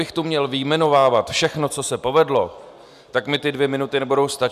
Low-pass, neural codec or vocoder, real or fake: 14.4 kHz; none; real